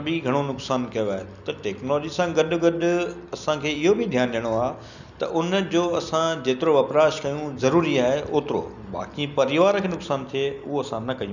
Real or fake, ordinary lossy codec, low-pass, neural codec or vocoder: real; none; 7.2 kHz; none